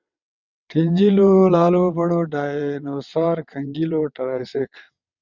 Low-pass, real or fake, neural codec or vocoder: 7.2 kHz; fake; vocoder, 22.05 kHz, 80 mel bands, WaveNeXt